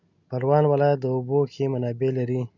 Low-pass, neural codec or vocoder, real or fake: 7.2 kHz; none; real